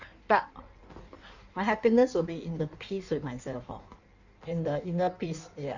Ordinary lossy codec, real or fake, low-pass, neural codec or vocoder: none; fake; 7.2 kHz; codec, 16 kHz in and 24 kHz out, 1.1 kbps, FireRedTTS-2 codec